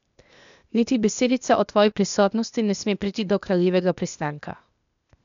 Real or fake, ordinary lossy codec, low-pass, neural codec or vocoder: fake; none; 7.2 kHz; codec, 16 kHz, 0.8 kbps, ZipCodec